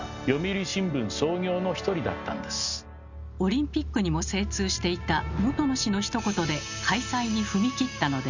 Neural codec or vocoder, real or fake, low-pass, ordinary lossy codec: none; real; 7.2 kHz; none